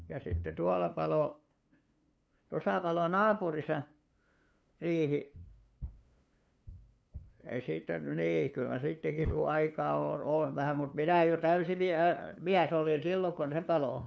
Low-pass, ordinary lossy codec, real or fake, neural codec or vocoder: none; none; fake; codec, 16 kHz, 2 kbps, FunCodec, trained on LibriTTS, 25 frames a second